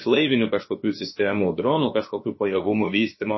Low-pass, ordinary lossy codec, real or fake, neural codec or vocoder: 7.2 kHz; MP3, 24 kbps; fake; codec, 16 kHz, about 1 kbps, DyCAST, with the encoder's durations